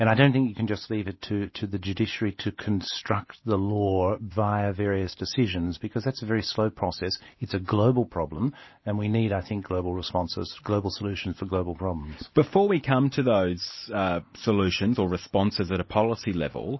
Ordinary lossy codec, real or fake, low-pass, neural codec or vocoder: MP3, 24 kbps; real; 7.2 kHz; none